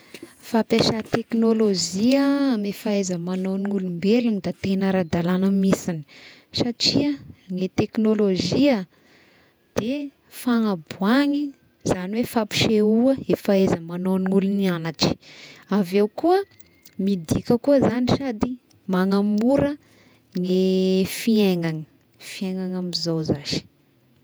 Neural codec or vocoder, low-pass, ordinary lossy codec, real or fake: vocoder, 48 kHz, 128 mel bands, Vocos; none; none; fake